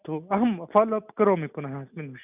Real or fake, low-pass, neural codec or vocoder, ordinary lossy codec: real; 3.6 kHz; none; none